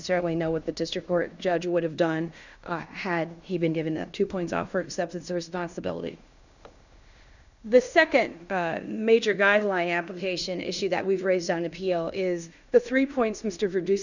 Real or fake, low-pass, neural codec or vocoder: fake; 7.2 kHz; codec, 16 kHz in and 24 kHz out, 0.9 kbps, LongCat-Audio-Codec, fine tuned four codebook decoder